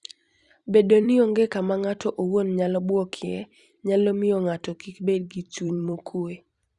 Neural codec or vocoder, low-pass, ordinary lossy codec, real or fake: vocoder, 44.1 kHz, 128 mel bands every 256 samples, BigVGAN v2; 10.8 kHz; Opus, 64 kbps; fake